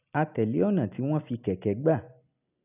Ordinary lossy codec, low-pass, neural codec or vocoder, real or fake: none; 3.6 kHz; none; real